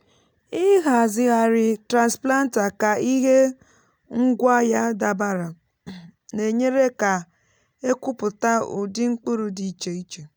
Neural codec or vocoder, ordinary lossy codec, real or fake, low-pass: none; none; real; none